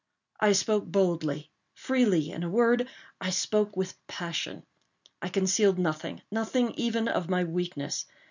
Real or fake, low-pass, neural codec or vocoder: real; 7.2 kHz; none